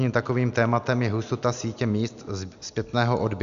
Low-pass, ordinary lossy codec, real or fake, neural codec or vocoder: 7.2 kHz; AAC, 96 kbps; real; none